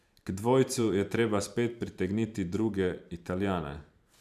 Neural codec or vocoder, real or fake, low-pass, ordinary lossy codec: vocoder, 44.1 kHz, 128 mel bands every 512 samples, BigVGAN v2; fake; 14.4 kHz; none